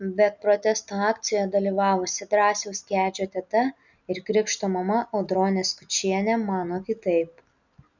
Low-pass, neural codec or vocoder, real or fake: 7.2 kHz; none; real